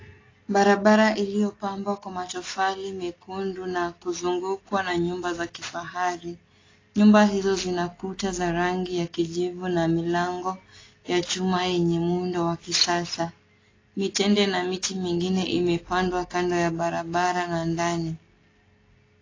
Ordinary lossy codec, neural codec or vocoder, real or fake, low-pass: AAC, 32 kbps; none; real; 7.2 kHz